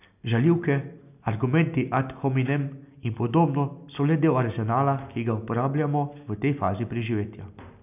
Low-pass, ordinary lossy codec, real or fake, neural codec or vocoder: 3.6 kHz; none; real; none